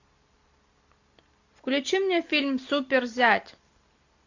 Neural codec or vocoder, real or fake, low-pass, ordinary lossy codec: none; real; 7.2 kHz; AAC, 48 kbps